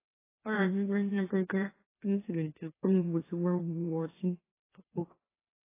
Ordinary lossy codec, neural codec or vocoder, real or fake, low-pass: AAC, 16 kbps; autoencoder, 44.1 kHz, a latent of 192 numbers a frame, MeloTTS; fake; 3.6 kHz